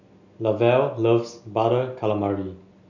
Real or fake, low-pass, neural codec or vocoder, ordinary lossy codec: real; 7.2 kHz; none; none